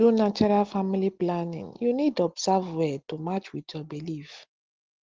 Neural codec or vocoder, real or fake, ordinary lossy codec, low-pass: none; real; Opus, 16 kbps; 7.2 kHz